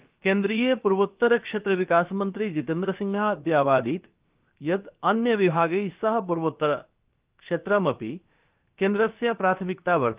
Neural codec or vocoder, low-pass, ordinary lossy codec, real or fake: codec, 16 kHz, about 1 kbps, DyCAST, with the encoder's durations; 3.6 kHz; Opus, 32 kbps; fake